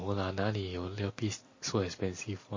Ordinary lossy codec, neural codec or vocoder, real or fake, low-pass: MP3, 32 kbps; none; real; 7.2 kHz